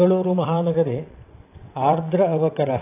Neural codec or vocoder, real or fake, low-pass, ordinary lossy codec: vocoder, 22.05 kHz, 80 mel bands, WaveNeXt; fake; 3.6 kHz; AAC, 24 kbps